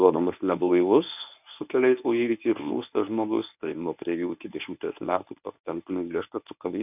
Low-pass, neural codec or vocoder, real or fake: 3.6 kHz; codec, 24 kHz, 0.9 kbps, WavTokenizer, medium speech release version 1; fake